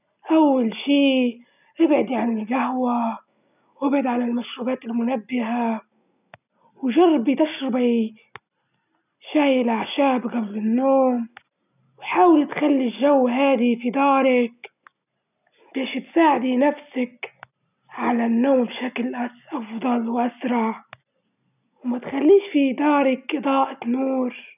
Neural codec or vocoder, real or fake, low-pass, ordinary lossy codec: none; real; 3.6 kHz; none